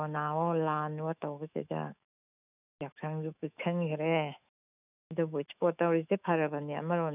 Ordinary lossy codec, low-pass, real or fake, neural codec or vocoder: none; 3.6 kHz; fake; autoencoder, 48 kHz, 128 numbers a frame, DAC-VAE, trained on Japanese speech